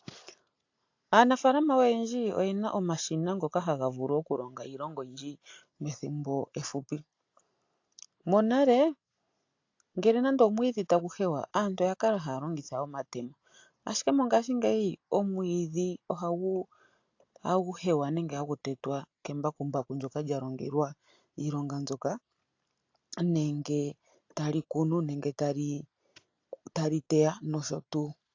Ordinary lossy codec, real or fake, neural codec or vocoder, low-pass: AAC, 48 kbps; real; none; 7.2 kHz